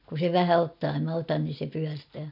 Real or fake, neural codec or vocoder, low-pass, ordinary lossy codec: real; none; 5.4 kHz; none